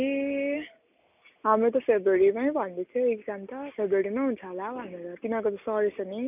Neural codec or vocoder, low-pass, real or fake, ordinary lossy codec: none; 3.6 kHz; real; none